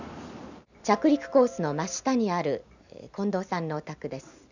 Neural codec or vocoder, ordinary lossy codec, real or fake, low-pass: none; none; real; 7.2 kHz